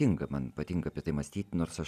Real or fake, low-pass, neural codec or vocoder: real; 14.4 kHz; none